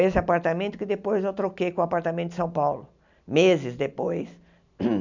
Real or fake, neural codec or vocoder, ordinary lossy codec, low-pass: real; none; none; 7.2 kHz